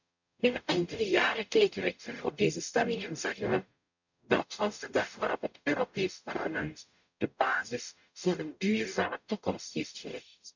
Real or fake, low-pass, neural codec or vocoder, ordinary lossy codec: fake; 7.2 kHz; codec, 44.1 kHz, 0.9 kbps, DAC; none